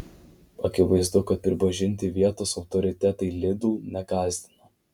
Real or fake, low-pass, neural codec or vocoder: real; 19.8 kHz; none